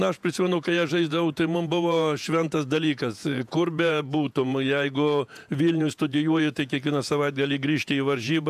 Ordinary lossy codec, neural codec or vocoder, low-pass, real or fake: AAC, 96 kbps; vocoder, 48 kHz, 128 mel bands, Vocos; 14.4 kHz; fake